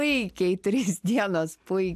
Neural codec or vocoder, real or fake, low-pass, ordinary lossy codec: none; real; 14.4 kHz; AAC, 96 kbps